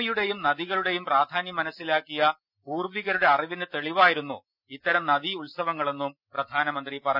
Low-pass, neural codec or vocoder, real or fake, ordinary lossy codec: 5.4 kHz; none; real; none